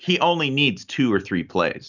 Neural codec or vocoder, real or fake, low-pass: none; real; 7.2 kHz